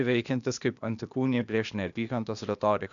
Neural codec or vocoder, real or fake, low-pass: codec, 16 kHz, 0.8 kbps, ZipCodec; fake; 7.2 kHz